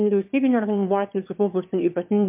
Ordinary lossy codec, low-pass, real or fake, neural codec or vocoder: MP3, 32 kbps; 3.6 kHz; fake; autoencoder, 22.05 kHz, a latent of 192 numbers a frame, VITS, trained on one speaker